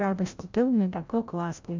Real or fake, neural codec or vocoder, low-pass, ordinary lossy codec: fake; codec, 16 kHz, 0.5 kbps, FreqCodec, larger model; 7.2 kHz; MP3, 64 kbps